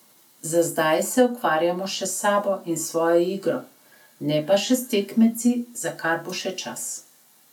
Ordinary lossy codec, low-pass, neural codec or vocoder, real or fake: none; 19.8 kHz; none; real